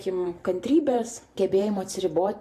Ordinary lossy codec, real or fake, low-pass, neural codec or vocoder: AAC, 64 kbps; fake; 14.4 kHz; vocoder, 44.1 kHz, 128 mel bands every 512 samples, BigVGAN v2